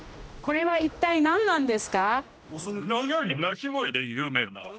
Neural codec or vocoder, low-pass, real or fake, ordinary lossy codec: codec, 16 kHz, 1 kbps, X-Codec, HuBERT features, trained on general audio; none; fake; none